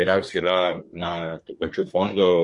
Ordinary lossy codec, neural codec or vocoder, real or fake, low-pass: MP3, 48 kbps; codec, 24 kHz, 1 kbps, SNAC; fake; 10.8 kHz